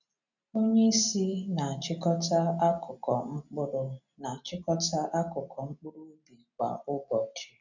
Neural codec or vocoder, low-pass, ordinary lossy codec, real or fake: none; 7.2 kHz; none; real